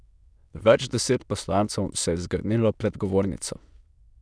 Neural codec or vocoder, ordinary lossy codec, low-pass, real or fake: autoencoder, 22.05 kHz, a latent of 192 numbers a frame, VITS, trained on many speakers; none; none; fake